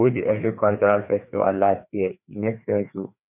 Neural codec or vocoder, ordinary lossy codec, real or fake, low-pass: codec, 16 kHz, 2 kbps, FreqCodec, larger model; none; fake; 3.6 kHz